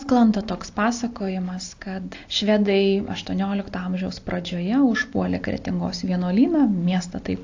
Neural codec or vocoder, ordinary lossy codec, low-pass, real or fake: none; AAC, 48 kbps; 7.2 kHz; real